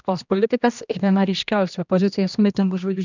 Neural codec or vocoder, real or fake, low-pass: codec, 16 kHz, 1 kbps, X-Codec, HuBERT features, trained on general audio; fake; 7.2 kHz